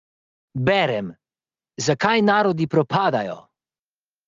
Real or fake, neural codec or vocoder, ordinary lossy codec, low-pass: real; none; Opus, 32 kbps; 7.2 kHz